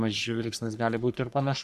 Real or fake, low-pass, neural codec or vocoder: fake; 14.4 kHz; codec, 44.1 kHz, 3.4 kbps, Pupu-Codec